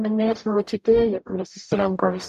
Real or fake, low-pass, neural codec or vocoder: fake; 14.4 kHz; codec, 44.1 kHz, 0.9 kbps, DAC